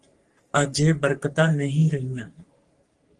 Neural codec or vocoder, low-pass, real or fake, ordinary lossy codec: codec, 44.1 kHz, 3.4 kbps, Pupu-Codec; 10.8 kHz; fake; Opus, 24 kbps